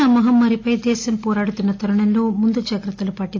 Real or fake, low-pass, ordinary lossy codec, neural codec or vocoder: real; 7.2 kHz; AAC, 48 kbps; none